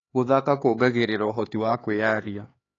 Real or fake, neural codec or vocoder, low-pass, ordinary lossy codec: fake; codec, 16 kHz, 4 kbps, X-Codec, HuBERT features, trained on LibriSpeech; 7.2 kHz; AAC, 32 kbps